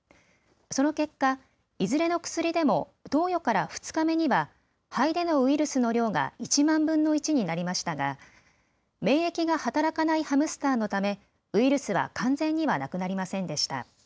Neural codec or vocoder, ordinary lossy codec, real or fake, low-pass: none; none; real; none